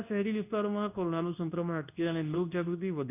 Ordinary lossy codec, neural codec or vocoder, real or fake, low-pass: none; codec, 24 kHz, 0.9 kbps, WavTokenizer, medium speech release version 2; fake; 3.6 kHz